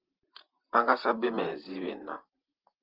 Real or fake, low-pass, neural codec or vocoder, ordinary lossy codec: fake; 5.4 kHz; vocoder, 44.1 kHz, 128 mel bands, Pupu-Vocoder; Opus, 32 kbps